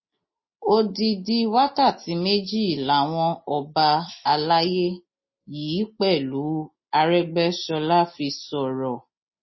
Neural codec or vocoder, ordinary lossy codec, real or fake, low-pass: none; MP3, 24 kbps; real; 7.2 kHz